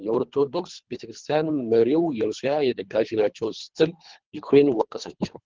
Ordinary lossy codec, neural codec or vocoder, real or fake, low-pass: Opus, 16 kbps; codec, 24 kHz, 3 kbps, HILCodec; fake; 7.2 kHz